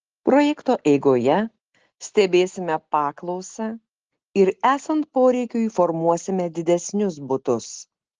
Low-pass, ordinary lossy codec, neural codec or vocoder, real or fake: 7.2 kHz; Opus, 16 kbps; none; real